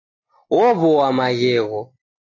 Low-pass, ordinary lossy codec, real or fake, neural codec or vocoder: 7.2 kHz; AAC, 32 kbps; real; none